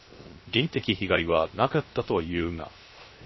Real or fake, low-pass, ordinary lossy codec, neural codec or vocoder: fake; 7.2 kHz; MP3, 24 kbps; codec, 16 kHz, 0.3 kbps, FocalCodec